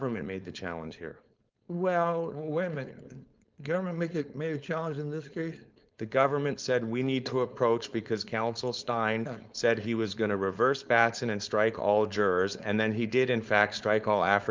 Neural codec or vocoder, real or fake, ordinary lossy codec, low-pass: codec, 16 kHz, 4.8 kbps, FACodec; fake; Opus, 24 kbps; 7.2 kHz